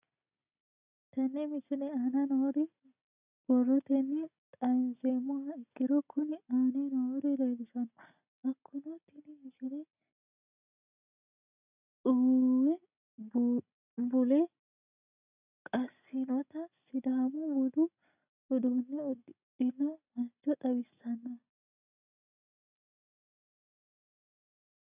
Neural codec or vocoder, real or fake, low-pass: none; real; 3.6 kHz